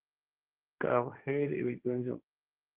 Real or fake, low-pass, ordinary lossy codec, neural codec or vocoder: fake; 3.6 kHz; Opus, 16 kbps; codec, 16 kHz, 1.1 kbps, Voila-Tokenizer